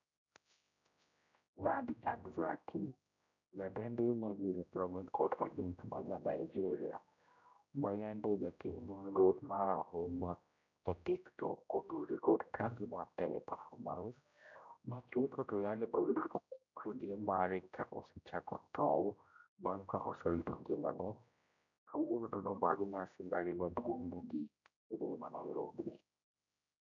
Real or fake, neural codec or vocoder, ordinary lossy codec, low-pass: fake; codec, 16 kHz, 0.5 kbps, X-Codec, HuBERT features, trained on general audio; none; 7.2 kHz